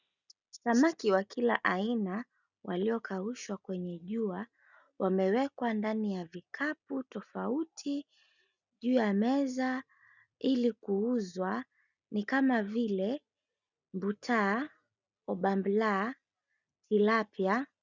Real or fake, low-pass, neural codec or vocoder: real; 7.2 kHz; none